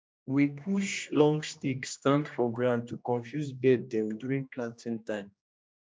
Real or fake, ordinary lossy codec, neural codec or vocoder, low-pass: fake; none; codec, 16 kHz, 1 kbps, X-Codec, HuBERT features, trained on general audio; none